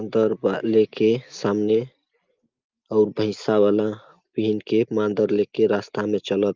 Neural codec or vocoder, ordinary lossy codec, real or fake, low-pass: none; Opus, 24 kbps; real; 7.2 kHz